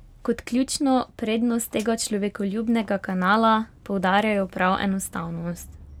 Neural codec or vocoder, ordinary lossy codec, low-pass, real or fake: none; none; 19.8 kHz; real